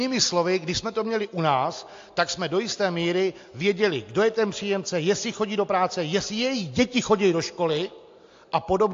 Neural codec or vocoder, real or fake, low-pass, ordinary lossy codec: none; real; 7.2 kHz; AAC, 48 kbps